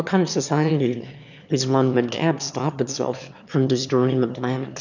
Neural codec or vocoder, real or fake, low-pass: autoencoder, 22.05 kHz, a latent of 192 numbers a frame, VITS, trained on one speaker; fake; 7.2 kHz